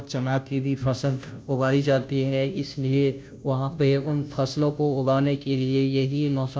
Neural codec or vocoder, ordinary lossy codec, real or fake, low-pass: codec, 16 kHz, 0.5 kbps, FunCodec, trained on Chinese and English, 25 frames a second; none; fake; none